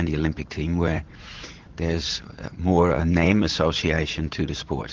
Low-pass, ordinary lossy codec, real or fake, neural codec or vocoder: 7.2 kHz; Opus, 16 kbps; fake; vocoder, 44.1 kHz, 80 mel bands, Vocos